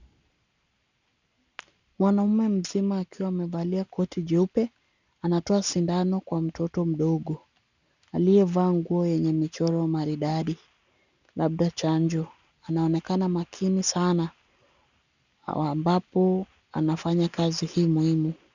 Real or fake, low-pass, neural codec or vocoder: real; 7.2 kHz; none